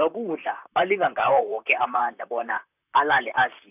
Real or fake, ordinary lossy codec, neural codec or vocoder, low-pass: fake; none; vocoder, 44.1 kHz, 128 mel bands, Pupu-Vocoder; 3.6 kHz